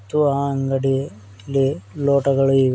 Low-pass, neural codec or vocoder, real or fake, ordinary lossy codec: none; none; real; none